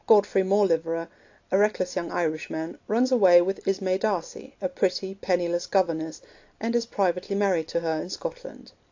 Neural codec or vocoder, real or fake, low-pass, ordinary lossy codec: none; real; 7.2 kHz; AAC, 48 kbps